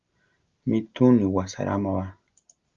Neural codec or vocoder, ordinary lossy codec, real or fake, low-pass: none; Opus, 32 kbps; real; 7.2 kHz